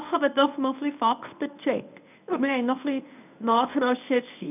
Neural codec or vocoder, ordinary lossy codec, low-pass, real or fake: codec, 24 kHz, 0.9 kbps, WavTokenizer, medium speech release version 1; none; 3.6 kHz; fake